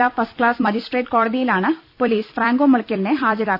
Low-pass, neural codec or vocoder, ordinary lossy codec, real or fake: 5.4 kHz; vocoder, 44.1 kHz, 128 mel bands every 256 samples, BigVGAN v2; none; fake